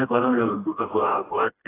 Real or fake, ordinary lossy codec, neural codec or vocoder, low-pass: fake; AAC, 16 kbps; codec, 16 kHz, 1 kbps, FreqCodec, smaller model; 3.6 kHz